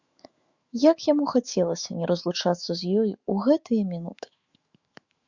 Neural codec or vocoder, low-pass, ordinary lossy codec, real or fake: autoencoder, 48 kHz, 128 numbers a frame, DAC-VAE, trained on Japanese speech; 7.2 kHz; Opus, 64 kbps; fake